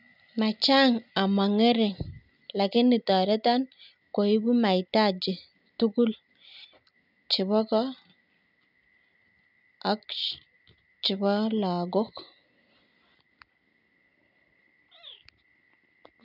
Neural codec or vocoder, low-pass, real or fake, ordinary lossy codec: none; 5.4 kHz; real; none